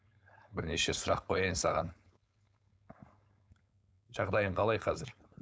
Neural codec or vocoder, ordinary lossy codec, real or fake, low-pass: codec, 16 kHz, 4.8 kbps, FACodec; none; fake; none